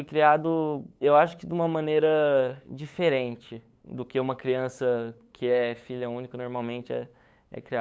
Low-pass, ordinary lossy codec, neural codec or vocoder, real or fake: none; none; codec, 16 kHz, 8 kbps, FunCodec, trained on LibriTTS, 25 frames a second; fake